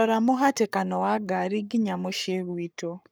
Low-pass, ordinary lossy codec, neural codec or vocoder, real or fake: none; none; codec, 44.1 kHz, 7.8 kbps, Pupu-Codec; fake